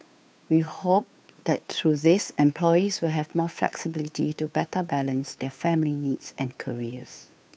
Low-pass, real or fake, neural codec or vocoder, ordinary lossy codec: none; fake; codec, 16 kHz, 2 kbps, FunCodec, trained on Chinese and English, 25 frames a second; none